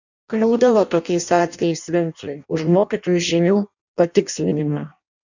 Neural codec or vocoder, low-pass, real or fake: codec, 16 kHz in and 24 kHz out, 0.6 kbps, FireRedTTS-2 codec; 7.2 kHz; fake